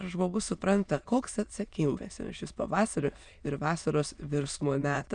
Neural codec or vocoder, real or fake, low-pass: autoencoder, 22.05 kHz, a latent of 192 numbers a frame, VITS, trained on many speakers; fake; 9.9 kHz